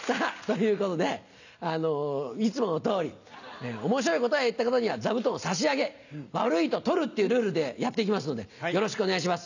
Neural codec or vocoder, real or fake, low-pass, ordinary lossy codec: none; real; 7.2 kHz; none